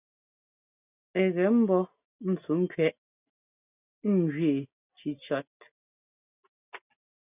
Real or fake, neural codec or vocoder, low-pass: real; none; 3.6 kHz